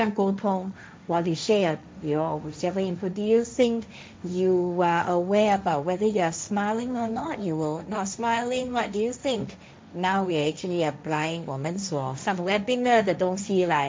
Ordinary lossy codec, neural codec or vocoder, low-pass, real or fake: none; codec, 16 kHz, 1.1 kbps, Voila-Tokenizer; none; fake